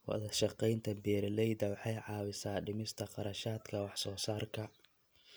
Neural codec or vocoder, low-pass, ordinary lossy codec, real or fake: none; none; none; real